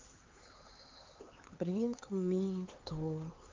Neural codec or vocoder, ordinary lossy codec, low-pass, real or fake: codec, 16 kHz, 4 kbps, X-Codec, HuBERT features, trained on LibriSpeech; Opus, 32 kbps; 7.2 kHz; fake